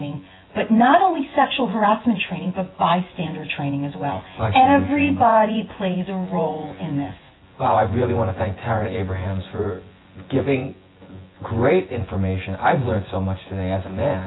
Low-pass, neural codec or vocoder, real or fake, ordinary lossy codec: 7.2 kHz; vocoder, 24 kHz, 100 mel bands, Vocos; fake; AAC, 16 kbps